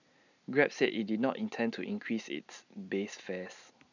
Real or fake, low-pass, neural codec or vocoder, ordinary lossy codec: real; 7.2 kHz; none; none